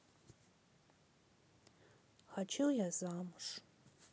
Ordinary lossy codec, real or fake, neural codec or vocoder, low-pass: none; real; none; none